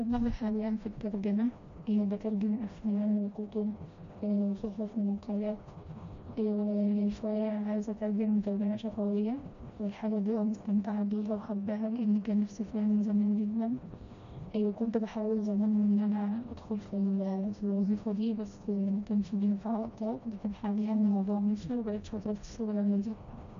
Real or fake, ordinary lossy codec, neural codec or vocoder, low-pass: fake; MP3, 48 kbps; codec, 16 kHz, 1 kbps, FreqCodec, smaller model; 7.2 kHz